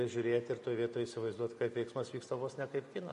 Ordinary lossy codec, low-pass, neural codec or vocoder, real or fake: MP3, 48 kbps; 14.4 kHz; none; real